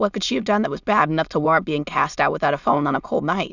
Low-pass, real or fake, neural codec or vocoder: 7.2 kHz; fake; autoencoder, 22.05 kHz, a latent of 192 numbers a frame, VITS, trained on many speakers